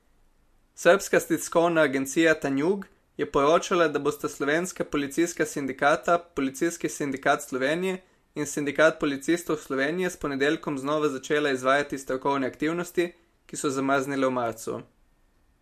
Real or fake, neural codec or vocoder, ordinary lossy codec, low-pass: real; none; MP3, 64 kbps; 14.4 kHz